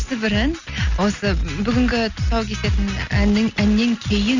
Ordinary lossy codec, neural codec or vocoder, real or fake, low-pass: none; none; real; 7.2 kHz